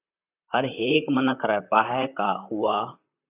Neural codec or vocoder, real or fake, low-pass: vocoder, 44.1 kHz, 128 mel bands, Pupu-Vocoder; fake; 3.6 kHz